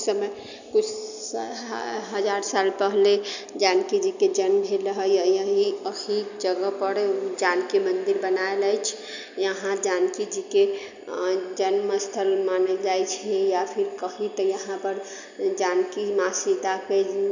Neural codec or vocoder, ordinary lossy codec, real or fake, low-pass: none; none; real; 7.2 kHz